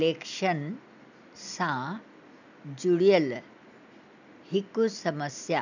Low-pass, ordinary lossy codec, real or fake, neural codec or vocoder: 7.2 kHz; none; real; none